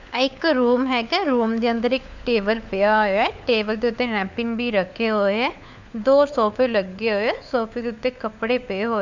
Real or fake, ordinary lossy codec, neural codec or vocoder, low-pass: fake; none; codec, 16 kHz, 4 kbps, FunCodec, trained on LibriTTS, 50 frames a second; 7.2 kHz